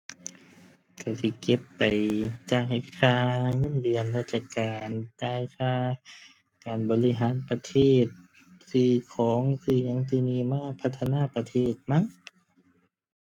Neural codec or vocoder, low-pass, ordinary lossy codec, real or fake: codec, 44.1 kHz, 7.8 kbps, Pupu-Codec; 19.8 kHz; none; fake